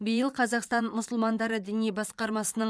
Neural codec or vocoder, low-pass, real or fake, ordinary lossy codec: vocoder, 22.05 kHz, 80 mel bands, Vocos; none; fake; none